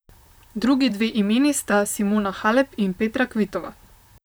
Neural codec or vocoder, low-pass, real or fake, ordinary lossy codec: vocoder, 44.1 kHz, 128 mel bands, Pupu-Vocoder; none; fake; none